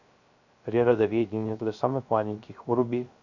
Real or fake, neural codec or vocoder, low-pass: fake; codec, 16 kHz, 0.3 kbps, FocalCodec; 7.2 kHz